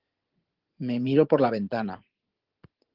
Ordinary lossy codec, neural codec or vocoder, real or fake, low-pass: Opus, 16 kbps; vocoder, 24 kHz, 100 mel bands, Vocos; fake; 5.4 kHz